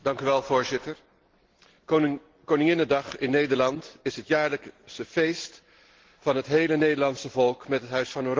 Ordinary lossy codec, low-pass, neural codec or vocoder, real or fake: Opus, 16 kbps; 7.2 kHz; none; real